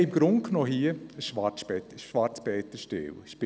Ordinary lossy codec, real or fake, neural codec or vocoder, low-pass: none; real; none; none